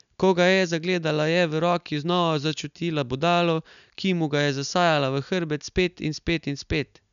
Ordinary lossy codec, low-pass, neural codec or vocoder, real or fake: none; 7.2 kHz; none; real